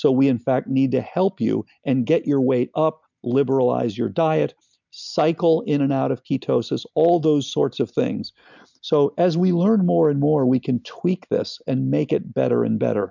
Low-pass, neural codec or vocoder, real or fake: 7.2 kHz; none; real